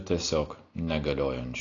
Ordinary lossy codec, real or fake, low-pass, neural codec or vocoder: AAC, 32 kbps; real; 7.2 kHz; none